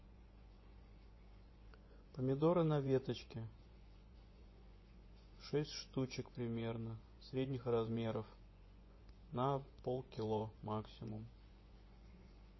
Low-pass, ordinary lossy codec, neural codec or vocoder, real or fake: 7.2 kHz; MP3, 24 kbps; vocoder, 24 kHz, 100 mel bands, Vocos; fake